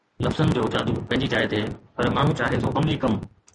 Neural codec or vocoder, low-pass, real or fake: none; 10.8 kHz; real